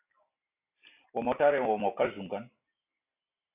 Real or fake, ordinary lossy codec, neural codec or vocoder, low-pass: real; AAC, 24 kbps; none; 3.6 kHz